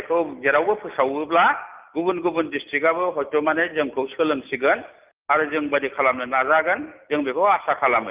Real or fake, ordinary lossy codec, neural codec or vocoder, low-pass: real; Opus, 32 kbps; none; 3.6 kHz